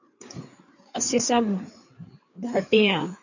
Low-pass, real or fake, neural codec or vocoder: 7.2 kHz; fake; codec, 16 kHz, 4 kbps, FreqCodec, larger model